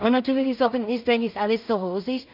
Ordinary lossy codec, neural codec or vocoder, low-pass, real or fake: none; codec, 16 kHz in and 24 kHz out, 0.4 kbps, LongCat-Audio-Codec, two codebook decoder; 5.4 kHz; fake